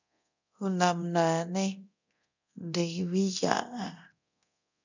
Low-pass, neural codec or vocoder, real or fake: 7.2 kHz; codec, 24 kHz, 0.9 kbps, DualCodec; fake